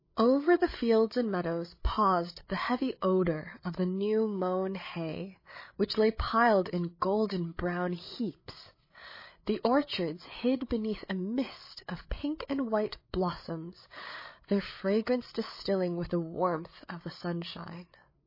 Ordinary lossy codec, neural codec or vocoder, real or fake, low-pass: MP3, 24 kbps; codec, 16 kHz, 8 kbps, FreqCodec, larger model; fake; 5.4 kHz